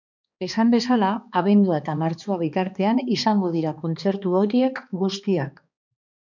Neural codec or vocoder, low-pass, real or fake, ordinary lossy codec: codec, 16 kHz, 2 kbps, X-Codec, HuBERT features, trained on balanced general audio; 7.2 kHz; fake; MP3, 64 kbps